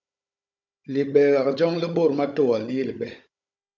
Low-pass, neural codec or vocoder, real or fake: 7.2 kHz; codec, 16 kHz, 16 kbps, FunCodec, trained on Chinese and English, 50 frames a second; fake